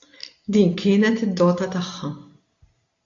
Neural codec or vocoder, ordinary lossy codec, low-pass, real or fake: none; AAC, 48 kbps; 7.2 kHz; real